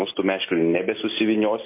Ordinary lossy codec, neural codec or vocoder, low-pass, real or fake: MP3, 24 kbps; none; 3.6 kHz; real